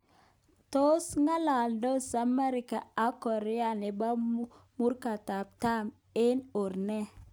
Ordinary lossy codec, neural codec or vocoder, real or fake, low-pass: none; none; real; none